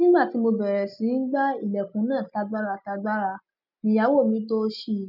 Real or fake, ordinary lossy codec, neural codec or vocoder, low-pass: real; none; none; 5.4 kHz